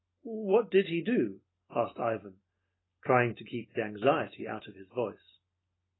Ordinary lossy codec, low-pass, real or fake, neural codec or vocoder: AAC, 16 kbps; 7.2 kHz; real; none